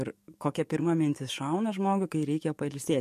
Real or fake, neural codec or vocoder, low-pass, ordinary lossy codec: fake; codec, 44.1 kHz, 7.8 kbps, DAC; 14.4 kHz; MP3, 64 kbps